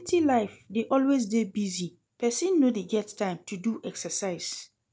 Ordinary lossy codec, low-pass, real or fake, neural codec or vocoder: none; none; real; none